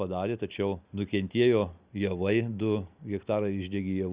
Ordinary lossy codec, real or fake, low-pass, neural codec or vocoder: Opus, 64 kbps; real; 3.6 kHz; none